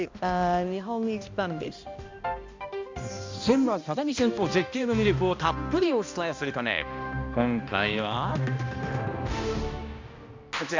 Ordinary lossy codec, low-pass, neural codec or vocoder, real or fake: MP3, 64 kbps; 7.2 kHz; codec, 16 kHz, 1 kbps, X-Codec, HuBERT features, trained on balanced general audio; fake